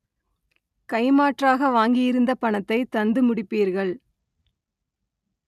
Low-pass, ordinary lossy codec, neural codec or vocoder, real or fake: 14.4 kHz; none; none; real